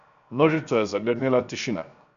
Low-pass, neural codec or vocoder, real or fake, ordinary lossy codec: 7.2 kHz; codec, 16 kHz, 0.7 kbps, FocalCodec; fake; none